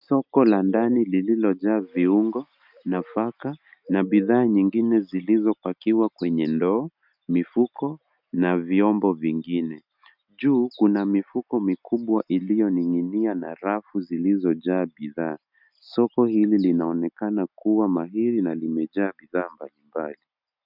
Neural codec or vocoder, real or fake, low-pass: none; real; 5.4 kHz